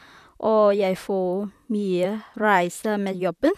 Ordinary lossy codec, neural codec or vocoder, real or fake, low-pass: none; vocoder, 44.1 kHz, 128 mel bands, Pupu-Vocoder; fake; 14.4 kHz